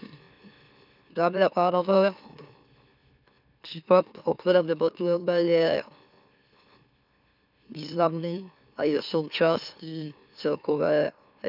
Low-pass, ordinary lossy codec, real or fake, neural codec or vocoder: 5.4 kHz; none; fake; autoencoder, 44.1 kHz, a latent of 192 numbers a frame, MeloTTS